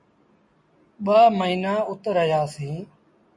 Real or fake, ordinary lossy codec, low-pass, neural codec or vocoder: real; MP3, 48 kbps; 10.8 kHz; none